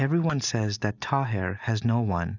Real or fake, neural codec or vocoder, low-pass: real; none; 7.2 kHz